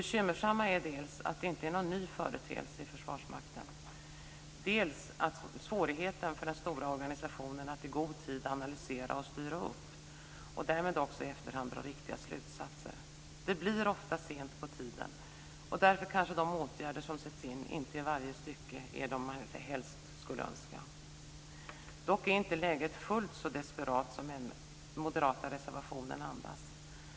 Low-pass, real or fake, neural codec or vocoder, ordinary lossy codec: none; real; none; none